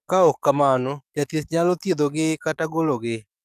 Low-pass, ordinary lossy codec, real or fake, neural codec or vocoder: 14.4 kHz; none; fake; codec, 44.1 kHz, 7.8 kbps, DAC